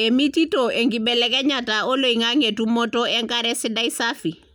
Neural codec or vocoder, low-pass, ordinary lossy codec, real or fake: none; none; none; real